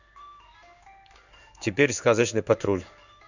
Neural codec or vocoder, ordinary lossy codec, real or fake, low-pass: codec, 16 kHz in and 24 kHz out, 1 kbps, XY-Tokenizer; none; fake; 7.2 kHz